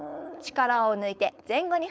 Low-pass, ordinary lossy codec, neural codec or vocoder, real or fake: none; none; codec, 16 kHz, 4.8 kbps, FACodec; fake